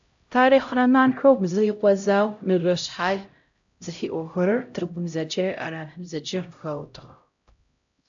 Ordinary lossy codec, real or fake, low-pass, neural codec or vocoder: AAC, 64 kbps; fake; 7.2 kHz; codec, 16 kHz, 0.5 kbps, X-Codec, HuBERT features, trained on LibriSpeech